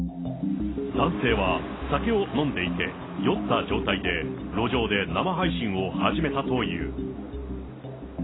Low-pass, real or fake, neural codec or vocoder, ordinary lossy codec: 7.2 kHz; real; none; AAC, 16 kbps